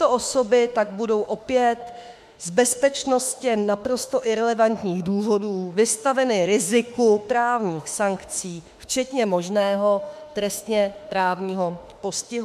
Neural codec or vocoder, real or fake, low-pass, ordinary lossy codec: autoencoder, 48 kHz, 32 numbers a frame, DAC-VAE, trained on Japanese speech; fake; 14.4 kHz; MP3, 96 kbps